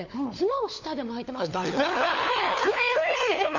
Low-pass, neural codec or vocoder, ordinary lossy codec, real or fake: 7.2 kHz; codec, 16 kHz, 4 kbps, FunCodec, trained on LibriTTS, 50 frames a second; none; fake